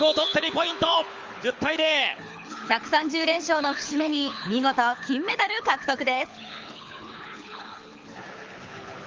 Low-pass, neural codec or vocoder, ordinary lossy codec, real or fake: 7.2 kHz; codec, 24 kHz, 6 kbps, HILCodec; Opus, 32 kbps; fake